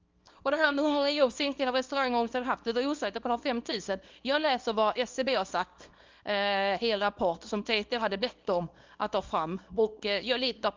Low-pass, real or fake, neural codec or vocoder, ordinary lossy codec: 7.2 kHz; fake; codec, 24 kHz, 0.9 kbps, WavTokenizer, small release; Opus, 32 kbps